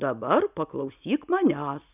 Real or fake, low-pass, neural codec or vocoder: real; 3.6 kHz; none